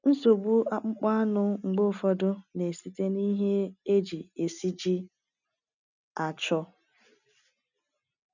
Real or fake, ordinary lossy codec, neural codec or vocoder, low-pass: real; none; none; 7.2 kHz